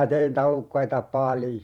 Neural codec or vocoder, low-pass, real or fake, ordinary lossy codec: vocoder, 44.1 kHz, 128 mel bands every 512 samples, BigVGAN v2; 19.8 kHz; fake; Opus, 64 kbps